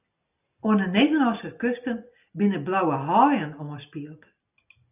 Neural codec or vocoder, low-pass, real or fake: none; 3.6 kHz; real